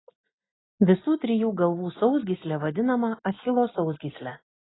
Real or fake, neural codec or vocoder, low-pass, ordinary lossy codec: real; none; 7.2 kHz; AAC, 16 kbps